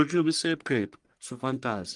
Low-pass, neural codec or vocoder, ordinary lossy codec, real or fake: 10.8 kHz; codec, 44.1 kHz, 1.7 kbps, Pupu-Codec; Opus, 32 kbps; fake